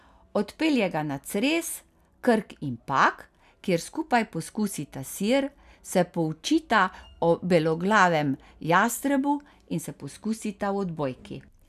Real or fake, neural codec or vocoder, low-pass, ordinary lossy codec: real; none; 14.4 kHz; none